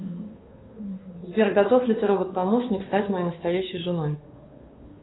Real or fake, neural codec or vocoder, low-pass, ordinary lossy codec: fake; codec, 16 kHz, 2 kbps, FunCodec, trained on Chinese and English, 25 frames a second; 7.2 kHz; AAC, 16 kbps